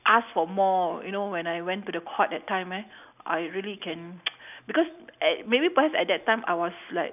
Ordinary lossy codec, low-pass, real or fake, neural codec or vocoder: none; 3.6 kHz; real; none